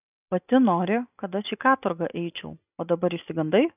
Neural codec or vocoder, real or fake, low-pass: none; real; 3.6 kHz